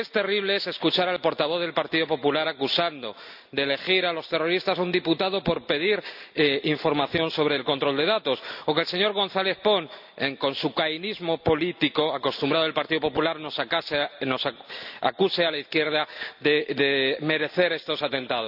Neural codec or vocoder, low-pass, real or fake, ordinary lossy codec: none; 5.4 kHz; real; none